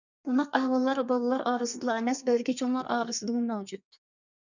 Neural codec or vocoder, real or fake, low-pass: codec, 32 kHz, 1.9 kbps, SNAC; fake; 7.2 kHz